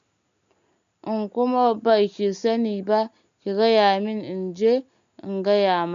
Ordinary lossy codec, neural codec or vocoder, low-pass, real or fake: AAC, 96 kbps; none; 7.2 kHz; real